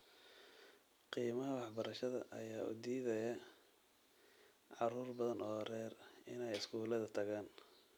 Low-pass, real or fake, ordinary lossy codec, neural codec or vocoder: none; real; none; none